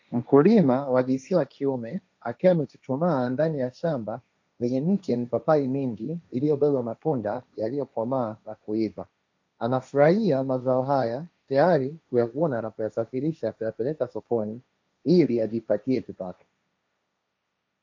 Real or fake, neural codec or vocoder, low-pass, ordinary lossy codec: fake; codec, 16 kHz, 1.1 kbps, Voila-Tokenizer; 7.2 kHz; MP3, 64 kbps